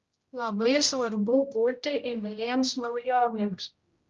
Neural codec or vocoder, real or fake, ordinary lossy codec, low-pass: codec, 16 kHz, 0.5 kbps, X-Codec, HuBERT features, trained on general audio; fake; Opus, 32 kbps; 7.2 kHz